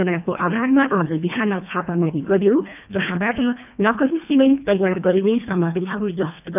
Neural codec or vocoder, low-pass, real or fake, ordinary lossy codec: codec, 24 kHz, 1.5 kbps, HILCodec; 3.6 kHz; fake; none